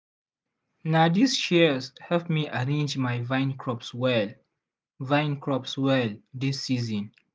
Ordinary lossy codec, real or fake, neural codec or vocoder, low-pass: none; real; none; none